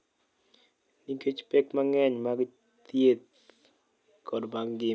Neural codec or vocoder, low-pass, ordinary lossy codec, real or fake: none; none; none; real